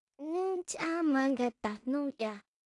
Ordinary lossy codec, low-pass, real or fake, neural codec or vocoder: AAC, 48 kbps; 10.8 kHz; fake; codec, 16 kHz in and 24 kHz out, 0.4 kbps, LongCat-Audio-Codec, two codebook decoder